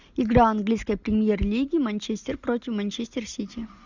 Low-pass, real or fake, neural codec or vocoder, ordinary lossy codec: 7.2 kHz; real; none; Opus, 64 kbps